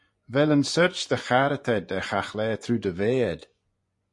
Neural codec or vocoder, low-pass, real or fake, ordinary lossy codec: vocoder, 24 kHz, 100 mel bands, Vocos; 10.8 kHz; fake; MP3, 48 kbps